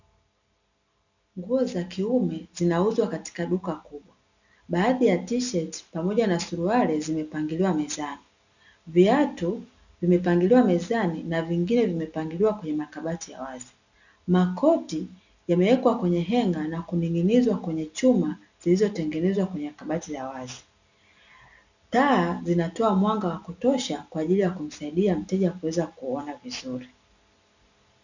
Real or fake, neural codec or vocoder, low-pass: real; none; 7.2 kHz